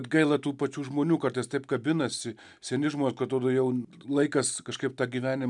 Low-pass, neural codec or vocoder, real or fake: 10.8 kHz; none; real